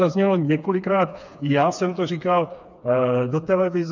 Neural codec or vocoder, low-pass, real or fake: codec, 16 kHz, 4 kbps, FreqCodec, smaller model; 7.2 kHz; fake